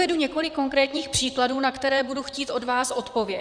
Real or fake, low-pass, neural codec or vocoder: fake; 9.9 kHz; vocoder, 44.1 kHz, 128 mel bands, Pupu-Vocoder